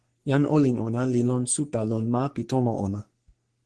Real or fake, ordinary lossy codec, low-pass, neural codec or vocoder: fake; Opus, 16 kbps; 10.8 kHz; codec, 44.1 kHz, 3.4 kbps, Pupu-Codec